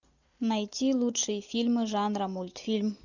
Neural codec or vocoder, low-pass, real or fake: none; 7.2 kHz; real